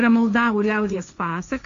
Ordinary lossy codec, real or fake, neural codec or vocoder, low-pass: MP3, 96 kbps; fake; codec, 16 kHz, 1.1 kbps, Voila-Tokenizer; 7.2 kHz